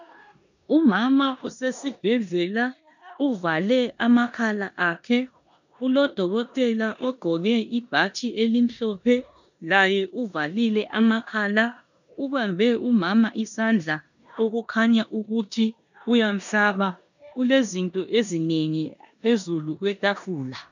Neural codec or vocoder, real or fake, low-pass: codec, 16 kHz in and 24 kHz out, 0.9 kbps, LongCat-Audio-Codec, four codebook decoder; fake; 7.2 kHz